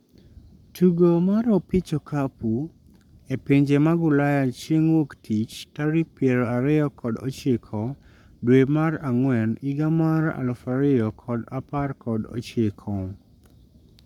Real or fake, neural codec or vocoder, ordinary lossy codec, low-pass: fake; codec, 44.1 kHz, 7.8 kbps, Pupu-Codec; none; 19.8 kHz